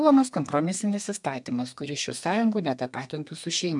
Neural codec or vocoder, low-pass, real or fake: codec, 32 kHz, 1.9 kbps, SNAC; 10.8 kHz; fake